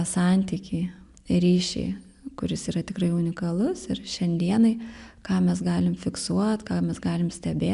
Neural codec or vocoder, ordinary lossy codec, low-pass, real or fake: none; MP3, 96 kbps; 10.8 kHz; real